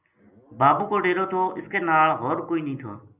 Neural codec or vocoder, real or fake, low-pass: none; real; 3.6 kHz